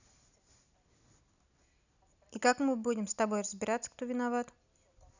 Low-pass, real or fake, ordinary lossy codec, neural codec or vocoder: 7.2 kHz; real; none; none